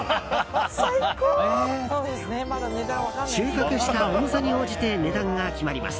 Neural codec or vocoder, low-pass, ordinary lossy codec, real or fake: none; none; none; real